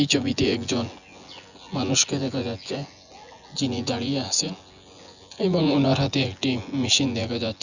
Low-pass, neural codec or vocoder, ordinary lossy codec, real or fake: 7.2 kHz; vocoder, 24 kHz, 100 mel bands, Vocos; none; fake